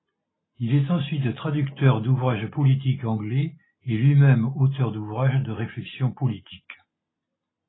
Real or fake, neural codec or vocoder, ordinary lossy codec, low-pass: real; none; AAC, 16 kbps; 7.2 kHz